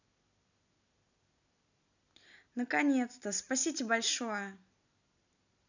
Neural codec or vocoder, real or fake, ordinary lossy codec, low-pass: none; real; none; 7.2 kHz